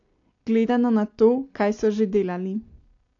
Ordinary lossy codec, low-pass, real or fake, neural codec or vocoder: AAC, 48 kbps; 7.2 kHz; real; none